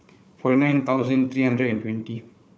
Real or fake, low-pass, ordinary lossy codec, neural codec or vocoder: fake; none; none; codec, 16 kHz, 4 kbps, FunCodec, trained on Chinese and English, 50 frames a second